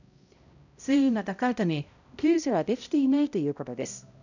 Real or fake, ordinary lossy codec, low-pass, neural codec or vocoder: fake; none; 7.2 kHz; codec, 16 kHz, 0.5 kbps, X-Codec, HuBERT features, trained on balanced general audio